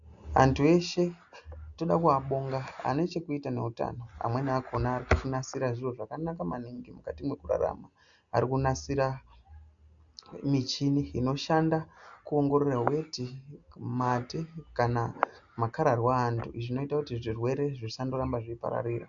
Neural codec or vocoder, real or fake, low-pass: none; real; 7.2 kHz